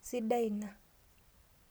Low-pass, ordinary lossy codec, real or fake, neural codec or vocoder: none; none; real; none